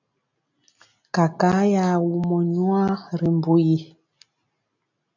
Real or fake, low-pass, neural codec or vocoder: real; 7.2 kHz; none